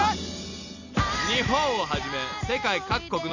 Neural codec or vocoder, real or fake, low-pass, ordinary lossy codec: none; real; 7.2 kHz; none